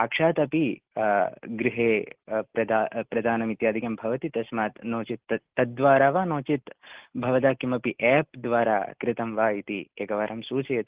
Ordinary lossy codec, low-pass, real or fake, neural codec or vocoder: Opus, 16 kbps; 3.6 kHz; real; none